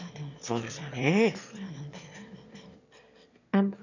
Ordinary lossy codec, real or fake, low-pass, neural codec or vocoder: none; fake; 7.2 kHz; autoencoder, 22.05 kHz, a latent of 192 numbers a frame, VITS, trained on one speaker